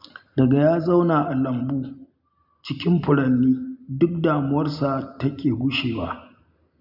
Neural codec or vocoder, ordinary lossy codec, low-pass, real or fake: none; none; 5.4 kHz; real